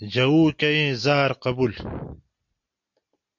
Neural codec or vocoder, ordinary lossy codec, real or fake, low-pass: none; MP3, 48 kbps; real; 7.2 kHz